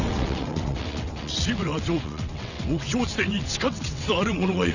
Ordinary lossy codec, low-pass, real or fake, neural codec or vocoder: none; 7.2 kHz; fake; vocoder, 22.05 kHz, 80 mel bands, WaveNeXt